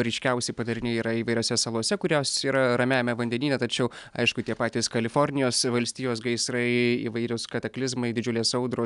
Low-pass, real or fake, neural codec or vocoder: 10.8 kHz; real; none